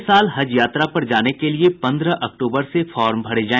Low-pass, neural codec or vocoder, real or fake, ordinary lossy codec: 7.2 kHz; none; real; none